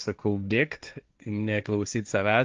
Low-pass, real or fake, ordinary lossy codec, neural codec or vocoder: 7.2 kHz; fake; Opus, 24 kbps; codec, 16 kHz, 1.1 kbps, Voila-Tokenizer